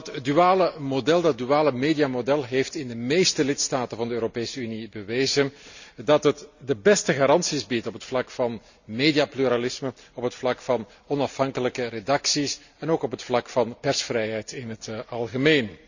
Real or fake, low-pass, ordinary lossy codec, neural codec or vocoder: real; 7.2 kHz; none; none